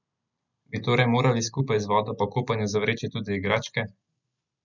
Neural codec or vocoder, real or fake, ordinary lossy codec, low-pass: none; real; none; 7.2 kHz